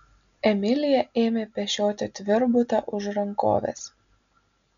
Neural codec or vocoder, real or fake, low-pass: none; real; 7.2 kHz